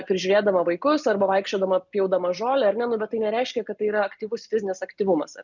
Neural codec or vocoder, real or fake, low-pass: none; real; 7.2 kHz